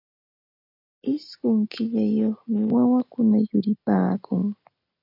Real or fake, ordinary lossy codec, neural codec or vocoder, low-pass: real; MP3, 48 kbps; none; 5.4 kHz